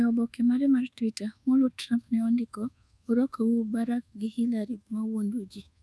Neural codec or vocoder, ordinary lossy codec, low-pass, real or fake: codec, 24 kHz, 1.2 kbps, DualCodec; none; none; fake